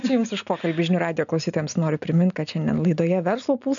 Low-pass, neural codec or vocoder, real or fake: 7.2 kHz; none; real